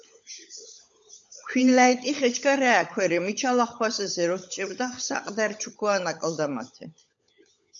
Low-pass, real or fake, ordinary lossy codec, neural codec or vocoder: 7.2 kHz; fake; MP3, 64 kbps; codec, 16 kHz, 16 kbps, FunCodec, trained on LibriTTS, 50 frames a second